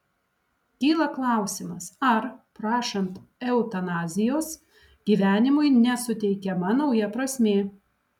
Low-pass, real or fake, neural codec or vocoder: 19.8 kHz; real; none